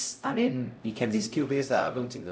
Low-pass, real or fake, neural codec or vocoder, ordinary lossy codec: none; fake; codec, 16 kHz, 0.5 kbps, X-Codec, HuBERT features, trained on LibriSpeech; none